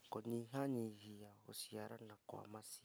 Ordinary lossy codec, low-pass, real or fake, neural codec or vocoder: none; none; real; none